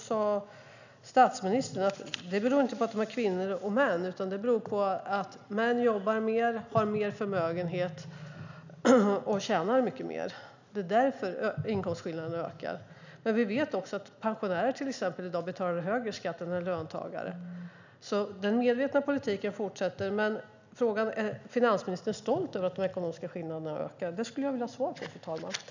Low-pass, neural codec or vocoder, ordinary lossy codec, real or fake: 7.2 kHz; none; none; real